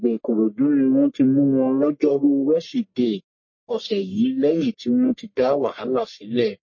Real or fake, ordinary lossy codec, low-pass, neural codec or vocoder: fake; MP3, 32 kbps; 7.2 kHz; codec, 44.1 kHz, 1.7 kbps, Pupu-Codec